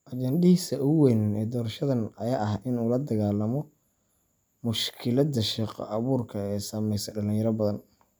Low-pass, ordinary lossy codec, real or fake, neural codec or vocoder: none; none; real; none